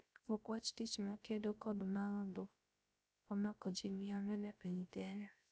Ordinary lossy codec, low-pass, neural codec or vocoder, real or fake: none; none; codec, 16 kHz, 0.3 kbps, FocalCodec; fake